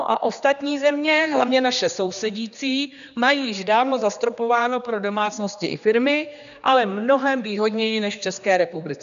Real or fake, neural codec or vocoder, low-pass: fake; codec, 16 kHz, 2 kbps, X-Codec, HuBERT features, trained on general audio; 7.2 kHz